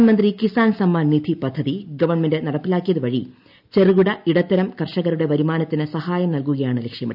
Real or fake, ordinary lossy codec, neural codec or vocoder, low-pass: real; none; none; 5.4 kHz